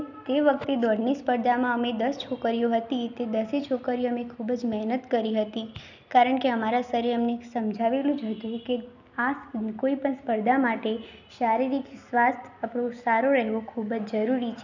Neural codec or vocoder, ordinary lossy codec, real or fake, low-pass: none; none; real; 7.2 kHz